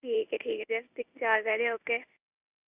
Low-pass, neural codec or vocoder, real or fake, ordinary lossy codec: 3.6 kHz; codec, 16 kHz in and 24 kHz out, 1 kbps, XY-Tokenizer; fake; Opus, 64 kbps